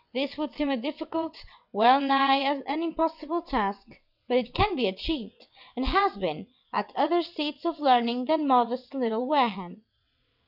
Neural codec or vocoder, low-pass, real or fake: vocoder, 22.05 kHz, 80 mel bands, WaveNeXt; 5.4 kHz; fake